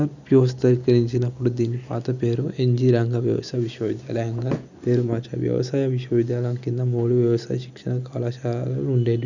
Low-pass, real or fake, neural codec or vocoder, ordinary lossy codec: 7.2 kHz; real; none; none